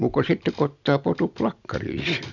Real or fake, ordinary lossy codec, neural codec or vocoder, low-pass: real; none; none; 7.2 kHz